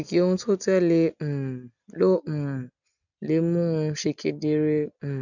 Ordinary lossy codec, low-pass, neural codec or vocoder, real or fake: none; 7.2 kHz; none; real